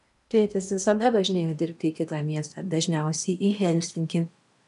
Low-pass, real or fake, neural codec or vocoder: 10.8 kHz; fake; codec, 16 kHz in and 24 kHz out, 0.8 kbps, FocalCodec, streaming, 65536 codes